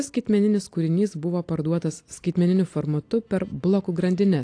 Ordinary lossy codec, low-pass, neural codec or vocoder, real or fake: AAC, 48 kbps; 9.9 kHz; none; real